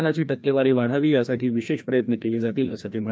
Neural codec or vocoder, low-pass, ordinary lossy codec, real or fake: codec, 16 kHz, 1 kbps, FreqCodec, larger model; none; none; fake